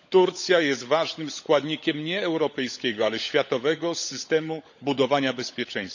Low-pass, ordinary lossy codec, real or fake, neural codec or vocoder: 7.2 kHz; none; fake; codec, 16 kHz, 16 kbps, FunCodec, trained on LibriTTS, 50 frames a second